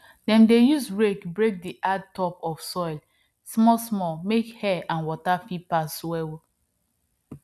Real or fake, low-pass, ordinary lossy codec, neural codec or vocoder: real; none; none; none